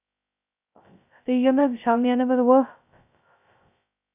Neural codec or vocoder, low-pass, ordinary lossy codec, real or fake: codec, 16 kHz, 0.2 kbps, FocalCodec; 3.6 kHz; none; fake